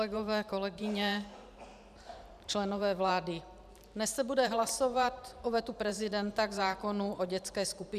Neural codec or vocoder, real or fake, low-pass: vocoder, 44.1 kHz, 128 mel bands every 512 samples, BigVGAN v2; fake; 14.4 kHz